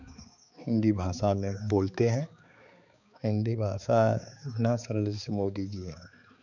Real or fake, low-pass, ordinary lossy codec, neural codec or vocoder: fake; 7.2 kHz; none; codec, 16 kHz, 4 kbps, X-Codec, HuBERT features, trained on balanced general audio